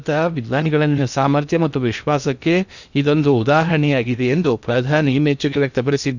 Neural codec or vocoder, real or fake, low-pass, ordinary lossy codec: codec, 16 kHz in and 24 kHz out, 0.8 kbps, FocalCodec, streaming, 65536 codes; fake; 7.2 kHz; none